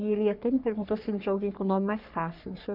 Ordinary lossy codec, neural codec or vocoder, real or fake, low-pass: none; codec, 44.1 kHz, 3.4 kbps, Pupu-Codec; fake; 5.4 kHz